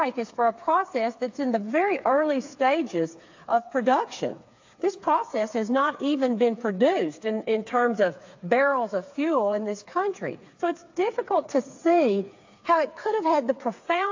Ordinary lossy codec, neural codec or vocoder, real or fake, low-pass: AAC, 48 kbps; codec, 16 kHz, 4 kbps, FreqCodec, smaller model; fake; 7.2 kHz